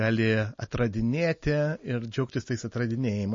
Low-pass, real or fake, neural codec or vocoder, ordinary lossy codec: 7.2 kHz; real; none; MP3, 32 kbps